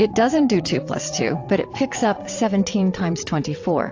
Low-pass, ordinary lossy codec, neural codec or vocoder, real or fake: 7.2 kHz; AAC, 48 kbps; vocoder, 22.05 kHz, 80 mel bands, WaveNeXt; fake